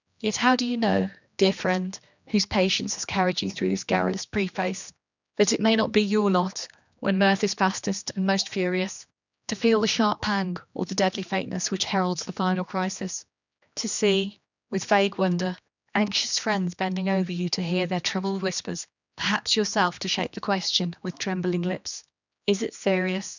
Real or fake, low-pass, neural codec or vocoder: fake; 7.2 kHz; codec, 16 kHz, 2 kbps, X-Codec, HuBERT features, trained on general audio